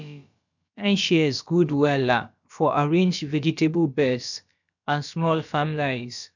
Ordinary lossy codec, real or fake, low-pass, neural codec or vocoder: none; fake; 7.2 kHz; codec, 16 kHz, about 1 kbps, DyCAST, with the encoder's durations